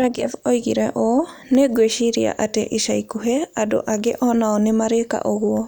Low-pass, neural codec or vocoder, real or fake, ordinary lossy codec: none; none; real; none